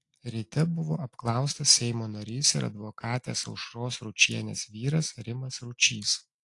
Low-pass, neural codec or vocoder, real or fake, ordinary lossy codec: 10.8 kHz; none; real; AAC, 48 kbps